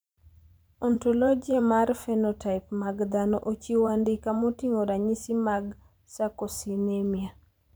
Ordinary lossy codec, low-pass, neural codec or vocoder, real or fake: none; none; vocoder, 44.1 kHz, 128 mel bands every 512 samples, BigVGAN v2; fake